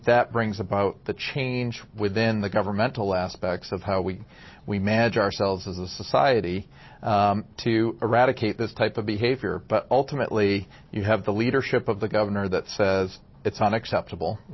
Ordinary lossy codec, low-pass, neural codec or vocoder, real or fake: MP3, 24 kbps; 7.2 kHz; none; real